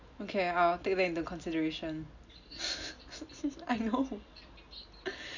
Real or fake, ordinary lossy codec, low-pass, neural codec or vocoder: real; none; 7.2 kHz; none